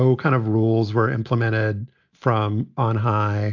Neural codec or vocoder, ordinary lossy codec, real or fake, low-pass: none; AAC, 48 kbps; real; 7.2 kHz